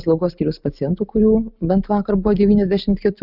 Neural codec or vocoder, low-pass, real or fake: none; 5.4 kHz; real